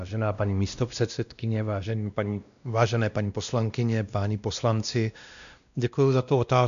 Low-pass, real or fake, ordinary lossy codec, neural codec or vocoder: 7.2 kHz; fake; MP3, 96 kbps; codec, 16 kHz, 1 kbps, X-Codec, WavLM features, trained on Multilingual LibriSpeech